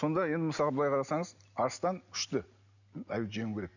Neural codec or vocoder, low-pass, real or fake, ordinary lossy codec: none; 7.2 kHz; real; none